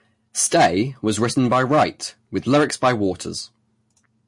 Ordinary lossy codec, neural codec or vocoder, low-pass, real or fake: MP3, 48 kbps; none; 10.8 kHz; real